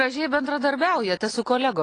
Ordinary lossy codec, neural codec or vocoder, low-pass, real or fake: AAC, 32 kbps; none; 9.9 kHz; real